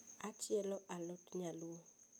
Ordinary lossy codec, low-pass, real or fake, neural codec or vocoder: none; none; real; none